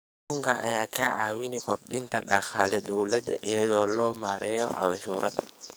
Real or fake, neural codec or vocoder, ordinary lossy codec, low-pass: fake; codec, 44.1 kHz, 2.6 kbps, SNAC; none; none